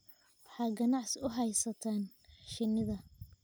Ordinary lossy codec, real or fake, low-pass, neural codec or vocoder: none; real; none; none